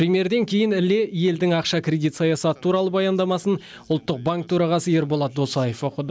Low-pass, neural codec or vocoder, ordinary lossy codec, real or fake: none; none; none; real